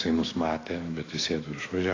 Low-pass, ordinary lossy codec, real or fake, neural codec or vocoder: 7.2 kHz; AAC, 32 kbps; fake; autoencoder, 48 kHz, 128 numbers a frame, DAC-VAE, trained on Japanese speech